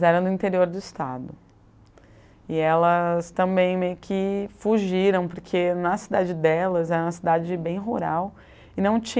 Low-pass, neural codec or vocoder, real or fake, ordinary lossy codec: none; none; real; none